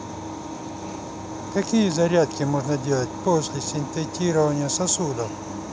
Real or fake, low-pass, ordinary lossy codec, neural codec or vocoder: real; none; none; none